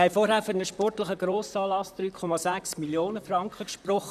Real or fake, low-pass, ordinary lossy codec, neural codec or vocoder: fake; 14.4 kHz; none; vocoder, 44.1 kHz, 128 mel bands, Pupu-Vocoder